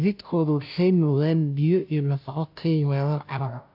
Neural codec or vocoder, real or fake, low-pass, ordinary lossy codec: codec, 16 kHz, 0.5 kbps, FunCodec, trained on Chinese and English, 25 frames a second; fake; 5.4 kHz; AAC, 48 kbps